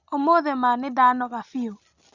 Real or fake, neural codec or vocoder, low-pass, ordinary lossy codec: real; none; 7.2 kHz; Opus, 64 kbps